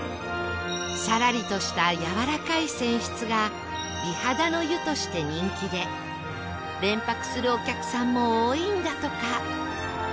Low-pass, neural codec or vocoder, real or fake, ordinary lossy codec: none; none; real; none